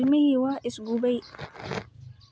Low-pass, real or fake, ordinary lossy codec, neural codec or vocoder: none; real; none; none